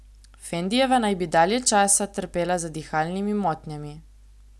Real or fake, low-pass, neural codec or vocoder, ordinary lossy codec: real; none; none; none